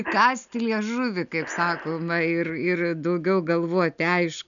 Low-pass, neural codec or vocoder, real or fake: 7.2 kHz; none; real